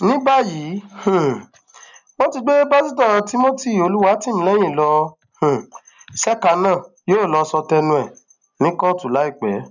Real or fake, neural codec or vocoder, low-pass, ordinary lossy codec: real; none; 7.2 kHz; none